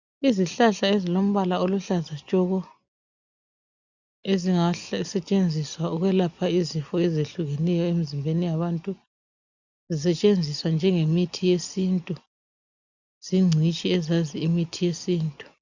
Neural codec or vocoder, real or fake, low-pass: none; real; 7.2 kHz